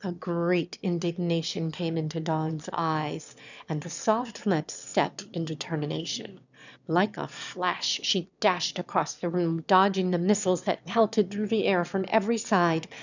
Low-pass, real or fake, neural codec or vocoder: 7.2 kHz; fake; autoencoder, 22.05 kHz, a latent of 192 numbers a frame, VITS, trained on one speaker